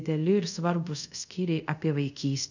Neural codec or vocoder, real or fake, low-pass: codec, 24 kHz, 0.5 kbps, DualCodec; fake; 7.2 kHz